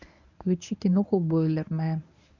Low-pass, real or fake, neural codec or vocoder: 7.2 kHz; fake; codec, 24 kHz, 0.9 kbps, WavTokenizer, medium speech release version 1